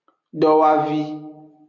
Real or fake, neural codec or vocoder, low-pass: real; none; 7.2 kHz